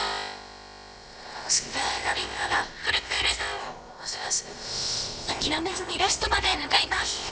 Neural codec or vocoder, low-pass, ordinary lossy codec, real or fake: codec, 16 kHz, about 1 kbps, DyCAST, with the encoder's durations; none; none; fake